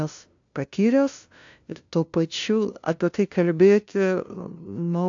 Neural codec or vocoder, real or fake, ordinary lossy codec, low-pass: codec, 16 kHz, 0.5 kbps, FunCodec, trained on LibriTTS, 25 frames a second; fake; AAC, 64 kbps; 7.2 kHz